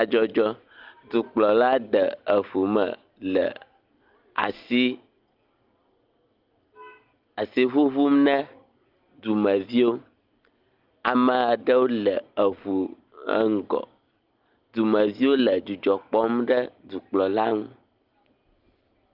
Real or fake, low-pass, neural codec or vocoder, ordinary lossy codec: real; 5.4 kHz; none; Opus, 32 kbps